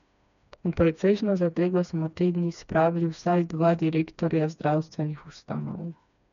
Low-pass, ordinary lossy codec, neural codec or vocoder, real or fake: 7.2 kHz; none; codec, 16 kHz, 2 kbps, FreqCodec, smaller model; fake